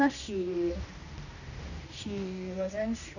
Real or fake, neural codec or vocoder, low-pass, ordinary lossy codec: fake; codec, 16 kHz, 1 kbps, X-Codec, HuBERT features, trained on general audio; 7.2 kHz; none